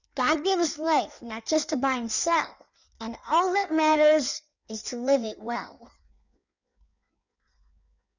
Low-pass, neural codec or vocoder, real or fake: 7.2 kHz; codec, 16 kHz in and 24 kHz out, 1.1 kbps, FireRedTTS-2 codec; fake